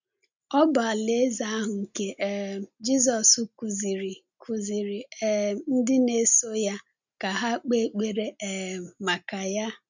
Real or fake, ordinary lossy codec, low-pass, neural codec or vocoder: real; none; 7.2 kHz; none